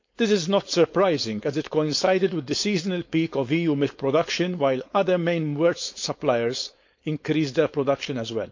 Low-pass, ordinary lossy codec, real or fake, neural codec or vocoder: 7.2 kHz; MP3, 48 kbps; fake; codec, 16 kHz, 4.8 kbps, FACodec